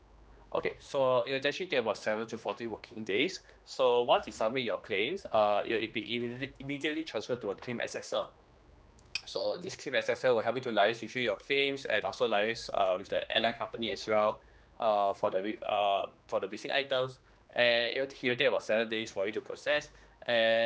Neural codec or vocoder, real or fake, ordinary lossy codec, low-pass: codec, 16 kHz, 2 kbps, X-Codec, HuBERT features, trained on general audio; fake; none; none